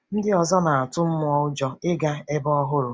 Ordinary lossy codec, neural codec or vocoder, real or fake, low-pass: none; none; real; none